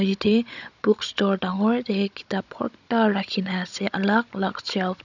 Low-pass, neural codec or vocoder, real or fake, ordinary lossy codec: 7.2 kHz; codec, 16 kHz, 16 kbps, FunCodec, trained on Chinese and English, 50 frames a second; fake; none